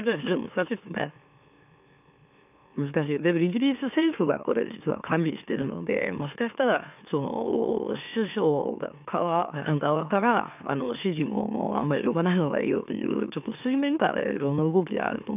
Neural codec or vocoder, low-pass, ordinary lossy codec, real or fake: autoencoder, 44.1 kHz, a latent of 192 numbers a frame, MeloTTS; 3.6 kHz; none; fake